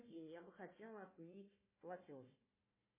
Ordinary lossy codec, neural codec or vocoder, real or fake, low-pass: MP3, 16 kbps; codec, 16 kHz, 1 kbps, FunCodec, trained on Chinese and English, 50 frames a second; fake; 3.6 kHz